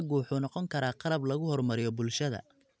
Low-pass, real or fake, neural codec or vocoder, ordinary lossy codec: none; real; none; none